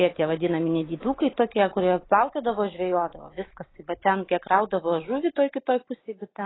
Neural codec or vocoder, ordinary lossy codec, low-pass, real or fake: none; AAC, 16 kbps; 7.2 kHz; real